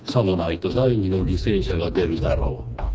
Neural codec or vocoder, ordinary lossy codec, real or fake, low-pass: codec, 16 kHz, 2 kbps, FreqCodec, smaller model; none; fake; none